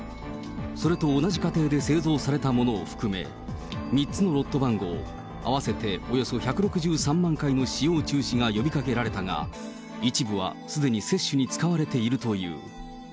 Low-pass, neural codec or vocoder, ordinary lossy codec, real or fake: none; none; none; real